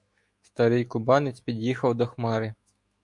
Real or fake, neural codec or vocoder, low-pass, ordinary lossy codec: fake; autoencoder, 48 kHz, 128 numbers a frame, DAC-VAE, trained on Japanese speech; 10.8 kHz; MP3, 64 kbps